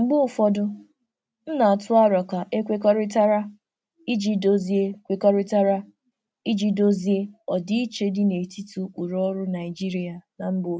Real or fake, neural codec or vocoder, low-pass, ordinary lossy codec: real; none; none; none